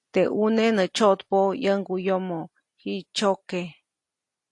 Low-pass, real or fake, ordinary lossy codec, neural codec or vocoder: 10.8 kHz; real; AAC, 48 kbps; none